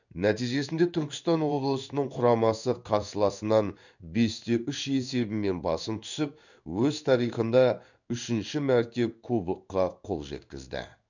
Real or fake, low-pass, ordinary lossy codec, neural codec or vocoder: fake; 7.2 kHz; none; codec, 16 kHz in and 24 kHz out, 1 kbps, XY-Tokenizer